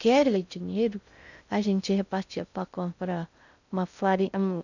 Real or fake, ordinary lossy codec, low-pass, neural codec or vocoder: fake; none; 7.2 kHz; codec, 16 kHz in and 24 kHz out, 0.6 kbps, FocalCodec, streaming, 2048 codes